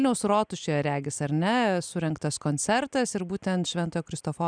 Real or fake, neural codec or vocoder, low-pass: real; none; 9.9 kHz